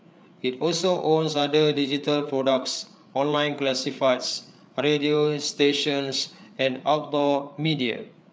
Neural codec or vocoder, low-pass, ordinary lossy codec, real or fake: codec, 16 kHz, 8 kbps, FreqCodec, larger model; none; none; fake